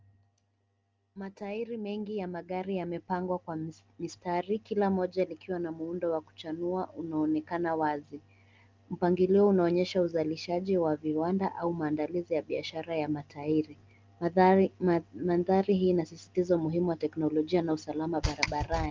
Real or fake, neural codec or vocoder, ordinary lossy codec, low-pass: real; none; Opus, 24 kbps; 7.2 kHz